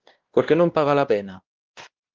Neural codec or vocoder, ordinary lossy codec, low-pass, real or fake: codec, 16 kHz, 1 kbps, X-Codec, WavLM features, trained on Multilingual LibriSpeech; Opus, 16 kbps; 7.2 kHz; fake